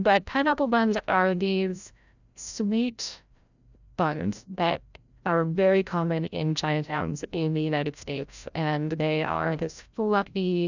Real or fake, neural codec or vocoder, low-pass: fake; codec, 16 kHz, 0.5 kbps, FreqCodec, larger model; 7.2 kHz